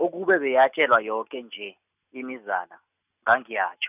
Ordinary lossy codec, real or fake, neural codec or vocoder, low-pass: none; real; none; 3.6 kHz